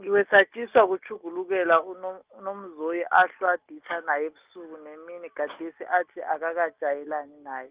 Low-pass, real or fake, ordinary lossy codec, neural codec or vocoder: 3.6 kHz; real; Opus, 32 kbps; none